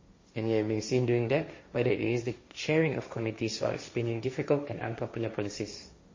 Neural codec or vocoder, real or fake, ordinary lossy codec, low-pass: codec, 16 kHz, 1.1 kbps, Voila-Tokenizer; fake; MP3, 32 kbps; 7.2 kHz